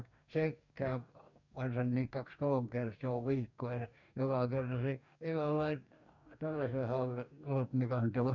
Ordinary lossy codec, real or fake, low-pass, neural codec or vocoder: none; fake; 7.2 kHz; codec, 44.1 kHz, 2.6 kbps, DAC